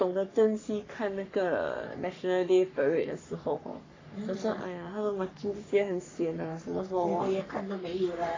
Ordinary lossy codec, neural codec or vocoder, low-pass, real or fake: AAC, 32 kbps; codec, 44.1 kHz, 3.4 kbps, Pupu-Codec; 7.2 kHz; fake